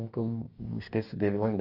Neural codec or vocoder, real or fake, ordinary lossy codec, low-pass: codec, 44.1 kHz, 2.6 kbps, DAC; fake; Opus, 32 kbps; 5.4 kHz